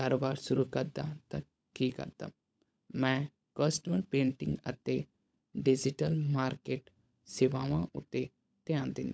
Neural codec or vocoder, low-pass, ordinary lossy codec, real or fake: codec, 16 kHz, 16 kbps, FunCodec, trained on LibriTTS, 50 frames a second; none; none; fake